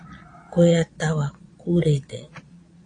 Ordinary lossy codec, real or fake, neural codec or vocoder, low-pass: AAC, 48 kbps; fake; vocoder, 22.05 kHz, 80 mel bands, Vocos; 9.9 kHz